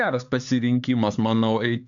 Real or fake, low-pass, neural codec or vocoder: fake; 7.2 kHz; codec, 16 kHz, 4 kbps, X-Codec, HuBERT features, trained on LibriSpeech